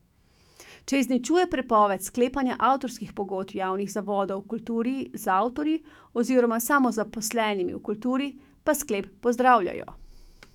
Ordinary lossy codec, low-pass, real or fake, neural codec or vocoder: none; 19.8 kHz; fake; autoencoder, 48 kHz, 128 numbers a frame, DAC-VAE, trained on Japanese speech